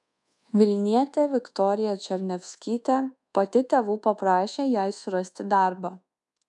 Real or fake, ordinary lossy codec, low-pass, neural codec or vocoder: fake; MP3, 96 kbps; 10.8 kHz; codec, 24 kHz, 1.2 kbps, DualCodec